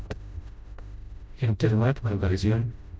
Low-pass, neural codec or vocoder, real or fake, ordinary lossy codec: none; codec, 16 kHz, 0.5 kbps, FreqCodec, smaller model; fake; none